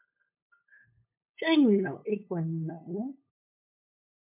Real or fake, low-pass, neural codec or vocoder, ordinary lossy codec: fake; 3.6 kHz; codec, 16 kHz, 8 kbps, FunCodec, trained on LibriTTS, 25 frames a second; MP3, 32 kbps